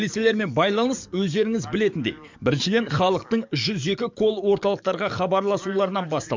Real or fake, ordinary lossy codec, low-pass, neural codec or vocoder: fake; MP3, 64 kbps; 7.2 kHz; codec, 44.1 kHz, 7.8 kbps, DAC